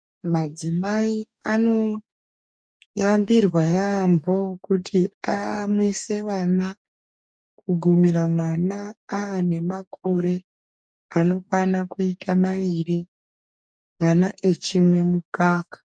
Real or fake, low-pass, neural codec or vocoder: fake; 9.9 kHz; codec, 44.1 kHz, 2.6 kbps, DAC